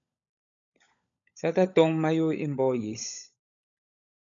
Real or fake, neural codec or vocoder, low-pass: fake; codec, 16 kHz, 16 kbps, FunCodec, trained on LibriTTS, 50 frames a second; 7.2 kHz